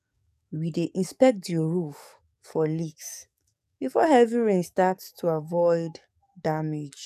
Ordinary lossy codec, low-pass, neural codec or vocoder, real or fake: none; 14.4 kHz; codec, 44.1 kHz, 7.8 kbps, DAC; fake